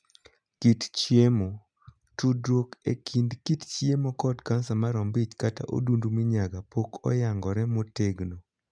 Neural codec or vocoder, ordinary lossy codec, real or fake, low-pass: none; none; real; 9.9 kHz